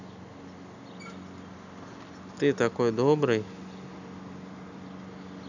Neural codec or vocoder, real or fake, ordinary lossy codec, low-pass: none; real; none; 7.2 kHz